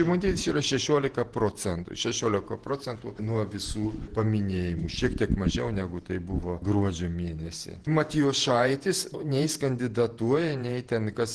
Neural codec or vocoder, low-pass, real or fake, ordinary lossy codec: vocoder, 48 kHz, 128 mel bands, Vocos; 10.8 kHz; fake; Opus, 16 kbps